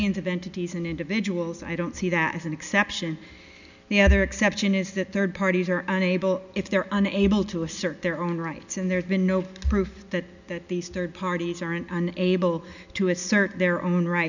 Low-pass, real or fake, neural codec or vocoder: 7.2 kHz; real; none